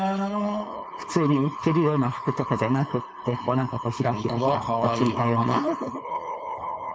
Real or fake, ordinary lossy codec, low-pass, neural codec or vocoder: fake; none; none; codec, 16 kHz, 4.8 kbps, FACodec